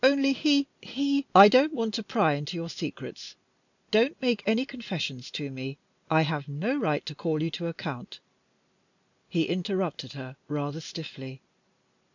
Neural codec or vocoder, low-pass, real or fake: none; 7.2 kHz; real